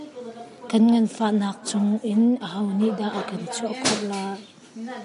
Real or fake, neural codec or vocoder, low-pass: real; none; 10.8 kHz